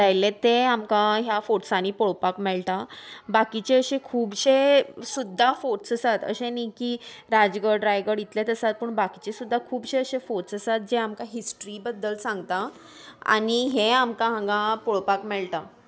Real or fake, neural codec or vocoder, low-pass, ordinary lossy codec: real; none; none; none